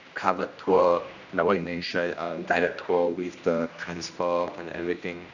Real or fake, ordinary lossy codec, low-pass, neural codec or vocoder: fake; none; 7.2 kHz; codec, 16 kHz, 1 kbps, X-Codec, HuBERT features, trained on general audio